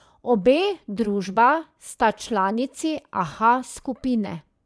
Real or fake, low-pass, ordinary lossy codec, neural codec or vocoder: fake; none; none; vocoder, 22.05 kHz, 80 mel bands, Vocos